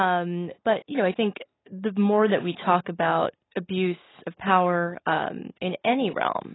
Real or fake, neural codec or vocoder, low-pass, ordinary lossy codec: real; none; 7.2 kHz; AAC, 16 kbps